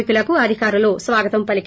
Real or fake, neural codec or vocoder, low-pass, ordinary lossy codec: real; none; none; none